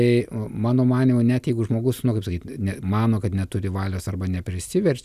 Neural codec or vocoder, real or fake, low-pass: none; real; 14.4 kHz